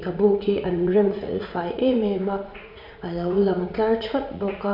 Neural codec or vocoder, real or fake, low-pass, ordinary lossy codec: vocoder, 44.1 kHz, 80 mel bands, Vocos; fake; 5.4 kHz; AAC, 48 kbps